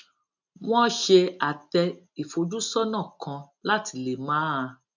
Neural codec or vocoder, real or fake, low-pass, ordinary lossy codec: none; real; 7.2 kHz; none